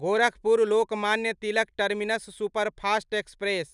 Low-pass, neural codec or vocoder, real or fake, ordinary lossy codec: 10.8 kHz; none; real; none